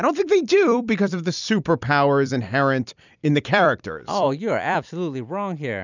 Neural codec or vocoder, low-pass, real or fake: vocoder, 44.1 kHz, 128 mel bands every 256 samples, BigVGAN v2; 7.2 kHz; fake